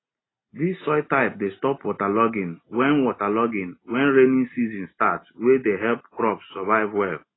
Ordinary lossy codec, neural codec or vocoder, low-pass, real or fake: AAC, 16 kbps; none; 7.2 kHz; real